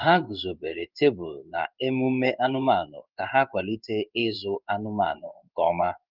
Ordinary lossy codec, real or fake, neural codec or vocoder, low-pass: Opus, 32 kbps; fake; codec, 16 kHz in and 24 kHz out, 1 kbps, XY-Tokenizer; 5.4 kHz